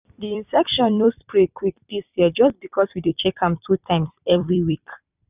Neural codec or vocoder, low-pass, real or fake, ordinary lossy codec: vocoder, 44.1 kHz, 128 mel bands every 256 samples, BigVGAN v2; 3.6 kHz; fake; none